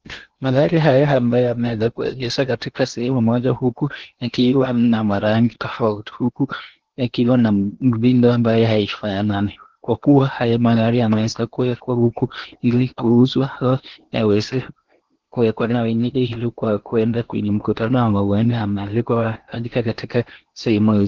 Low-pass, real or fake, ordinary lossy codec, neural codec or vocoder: 7.2 kHz; fake; Opus, 16 kbps; codec, 16 kHz in and 24 kHz out, 0.8 kbps, FocalCodec, streaming, 65536 codes